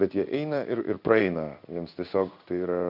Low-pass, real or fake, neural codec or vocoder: 5.4 kHz; fake; codec, 16 kHz in and 24 kHz out, 1 kbps, XY-Tokenizer